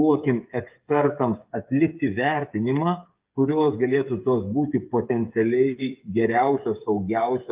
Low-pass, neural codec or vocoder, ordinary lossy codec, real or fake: 3.6 kHz; codec, 16 kHz, 8 kbps, FreqCodec, smaller model; Opus, 24 kbps; fake